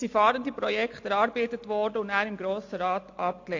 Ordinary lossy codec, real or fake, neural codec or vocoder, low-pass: AAC, 48 kbps; real; none; 7.2 kHz